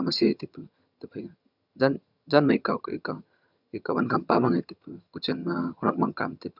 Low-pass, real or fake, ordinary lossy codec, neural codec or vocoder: 5.4 kHz; fake; none; vocoder, 22.05 kHz, 80 mel bands, HiFi-GAN